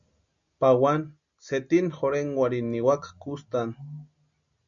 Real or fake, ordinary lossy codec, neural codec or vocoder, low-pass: real; MP3, 96 kbps; none; 7.2 kHz